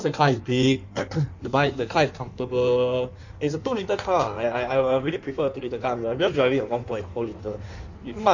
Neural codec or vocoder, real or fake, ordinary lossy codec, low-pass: codec, 16 kHz in and 24 kHz out, 1.1 kbps, FireRedTTS-2 codec; fake; none; 7.2 kHz